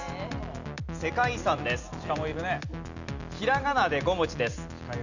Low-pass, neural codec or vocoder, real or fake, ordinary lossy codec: 7.2 kHz; none; real; none